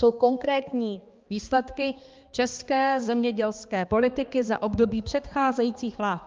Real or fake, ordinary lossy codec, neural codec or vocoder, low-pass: fake; Opus, 24 kbps; codec, 16 kHz, 2 kbps, X-Codec, HuBERT features, trained on balanced general audio; 7.2 kHz